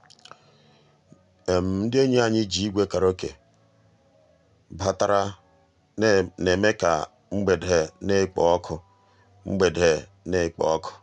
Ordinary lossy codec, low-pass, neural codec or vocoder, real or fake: none; 10.8 kHz; none; real